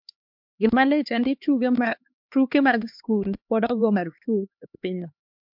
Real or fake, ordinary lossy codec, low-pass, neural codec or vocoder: fake; MP3, 48 kbps; 5.4 kHz; codec, 16 kHz, 2 kbps, X-Codec, HuBERT features, trained on LibriSpeech